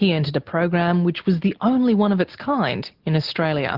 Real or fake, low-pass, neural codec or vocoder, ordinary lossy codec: real; 5.4 kHz; none; Opus, 16 kbps